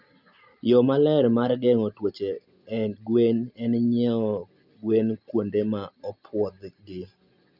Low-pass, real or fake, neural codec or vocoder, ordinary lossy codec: 5.4 kHz; real; none; none